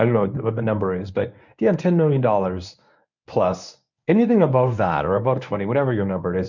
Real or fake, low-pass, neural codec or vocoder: fake; 7.2 kHz; codec, 24 kHz, 0.9 kbps, WavTokenizer, medium speech release version 1